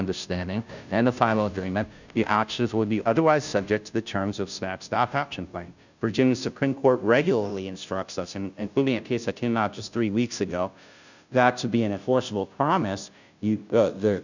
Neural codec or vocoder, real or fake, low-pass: codec, 16 kHz, 0.5 kbps, FunCodec, trained on Chinese and English, 25 frames a second; fake; 7.2 kHz